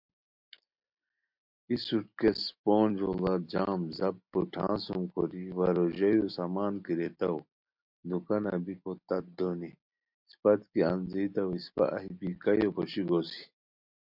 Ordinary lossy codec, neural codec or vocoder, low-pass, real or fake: MP3, 48 kbps; none; 5.4 kHz; real